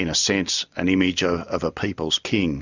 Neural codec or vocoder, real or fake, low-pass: none; real; 7.2 kHz